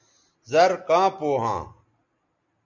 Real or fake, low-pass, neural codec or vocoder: real; 7.2 kHz; none